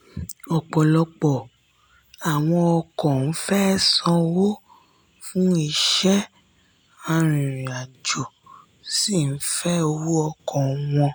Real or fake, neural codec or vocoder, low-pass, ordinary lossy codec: real; none; none; none